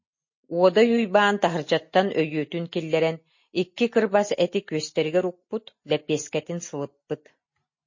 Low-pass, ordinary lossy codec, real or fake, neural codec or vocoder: 7.2 kHz; MP3, 32 kbps; real; none